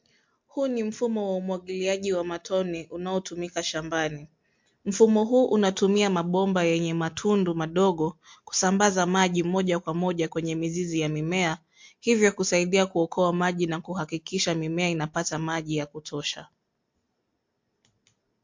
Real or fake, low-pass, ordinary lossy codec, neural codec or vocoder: real; 7.2 kHz; MP3, 48 kbps; none